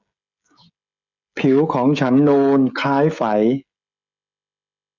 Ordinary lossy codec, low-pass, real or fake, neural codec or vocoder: none; 7.2 kHz; fake; codec, 16 kHz, 16 kbps, FreqCodec, smaller model